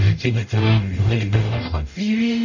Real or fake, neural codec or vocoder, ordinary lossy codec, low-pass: fake; codec, 44.1 kHz, 0.9 kbps, DAC; none; 7.2 kHz